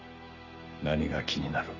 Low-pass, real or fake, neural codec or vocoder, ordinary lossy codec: 7.2 kHz; real; none; none